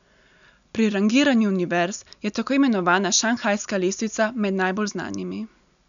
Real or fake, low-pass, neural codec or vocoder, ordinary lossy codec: real; 7.2 kHz; none; none